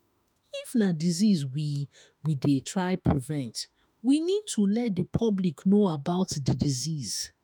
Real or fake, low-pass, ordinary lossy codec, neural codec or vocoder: fake; none; none; autoencoder, 48 kHz, 32 numbers a frame, DAC-VAE, trained on Japanese speech